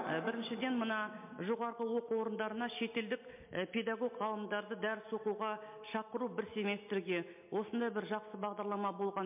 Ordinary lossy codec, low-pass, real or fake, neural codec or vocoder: MP3, 32 kbps; 3.6 kHz; real; none